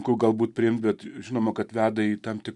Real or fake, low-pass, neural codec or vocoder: real; 10.8 kHz; none